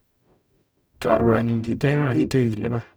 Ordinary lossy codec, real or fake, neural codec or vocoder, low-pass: none; fake; codec, 44.1 kHz, 0.9 kbps, DAC; none